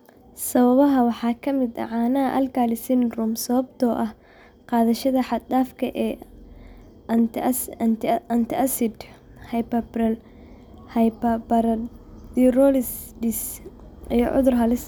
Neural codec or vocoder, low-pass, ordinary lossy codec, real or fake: none; none; none; real